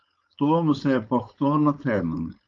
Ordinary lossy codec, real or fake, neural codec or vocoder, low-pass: Opus, 32 kbps; fake; codec, 16 kHz, 4.8 kbps, FACodec; 7.2 kHz